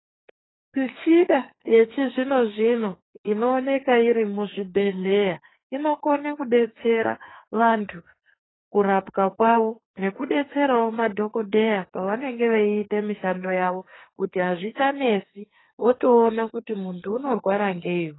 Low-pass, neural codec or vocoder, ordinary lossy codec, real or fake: 7.2 kHz; codec, 44.1 kHz, 2.6 kbps, SNAC; AAC, 16 kbps; fake